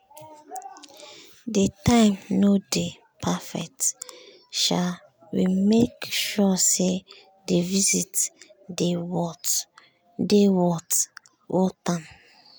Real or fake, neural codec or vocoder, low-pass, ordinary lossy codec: real; none; none; none